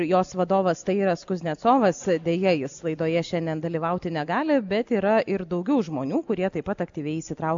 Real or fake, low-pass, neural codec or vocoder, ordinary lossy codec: real; 7.2 kHz; none; AAC, 64 kbps